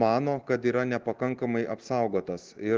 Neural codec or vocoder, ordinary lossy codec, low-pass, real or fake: none; Opus, 16 kbps; 7.2 kHz; real